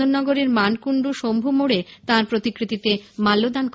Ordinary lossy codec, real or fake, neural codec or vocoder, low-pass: none; real; none; none